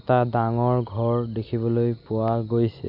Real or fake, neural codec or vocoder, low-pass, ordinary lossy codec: real; none; 5.4 kHz; none